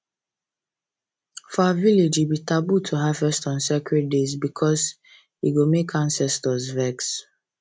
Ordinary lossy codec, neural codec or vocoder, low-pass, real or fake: none; none; none; real